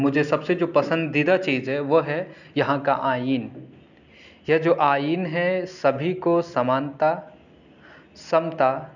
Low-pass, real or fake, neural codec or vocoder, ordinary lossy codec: 7.2 kHz; real; none; none